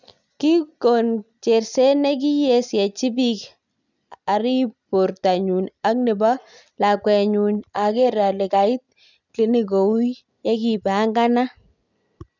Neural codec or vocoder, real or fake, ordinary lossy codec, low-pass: none; real; none; 7.2 kHz